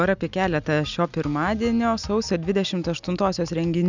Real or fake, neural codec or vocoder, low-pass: real; none; 7.2 kHz